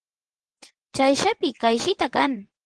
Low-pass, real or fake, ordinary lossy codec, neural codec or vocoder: 9.9 kHz; fake; Opus, 16 kbps; vocoder, 22.05 kHz, 80 mel bands, WaveNeXt